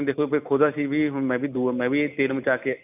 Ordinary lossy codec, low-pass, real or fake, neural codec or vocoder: none; 3.6 kHz; real; none